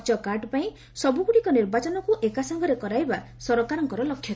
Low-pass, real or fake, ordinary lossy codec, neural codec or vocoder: none; real; none; none